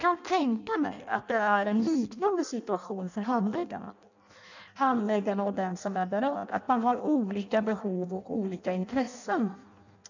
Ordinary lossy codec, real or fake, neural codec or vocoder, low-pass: none; fake; codec, 16 kHz in and 24 kHz out, 0.6 kbps, FireRedTTS-2 codec; 7.2 kHz